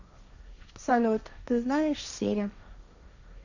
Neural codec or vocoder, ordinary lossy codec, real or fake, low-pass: codec, 16 kHz, 1.1 kbps, Voila-Tokenizer; none; fake; 7.2 kHz